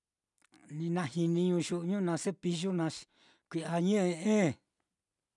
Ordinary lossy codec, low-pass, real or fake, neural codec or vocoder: none; 10.8 kHz; real; none